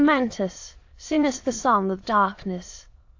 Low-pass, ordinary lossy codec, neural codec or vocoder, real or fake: 7.2 kHz; AAC, 48 kbps; autoencoder, 22.05 kHz, a latent of 192 numbers a frame, VITS, trained on many speakers; fake